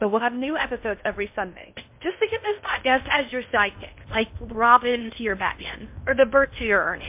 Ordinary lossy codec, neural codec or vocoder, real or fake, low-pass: MP3, 32 kbps; codec, 16 kHz in and 24 kHz out, 0.6 kbps, FocalCodec, streaming, 2048 codes; fake; 3.6 kHz